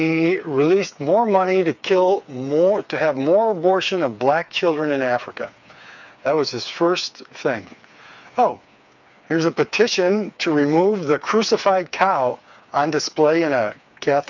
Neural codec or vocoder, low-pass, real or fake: codec, 16 kHz, 4 kbps, FreqCodec, smaller model; 7.2 kHz; fake